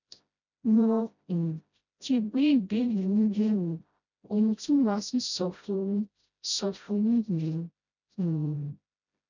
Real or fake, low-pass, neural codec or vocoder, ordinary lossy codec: fake; 7.2 kHz; codec, 16 kHz, 0.5 kbps, FreqCodec, smaller model; none